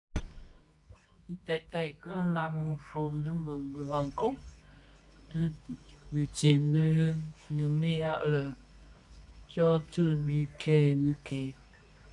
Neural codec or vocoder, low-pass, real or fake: codec, 24 kHz, 0.9 kbps, WavTokenizer, medium music audio release; 10.8 kHz; fake